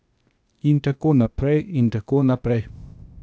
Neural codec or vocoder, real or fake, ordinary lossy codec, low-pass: codec, 16 kHz, 0.8 kbps, ZipCodec; fake; none; none